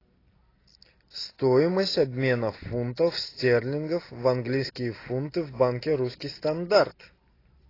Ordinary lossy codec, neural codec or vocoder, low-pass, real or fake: AAC, 24 kbps; none; 5.4 kHz; real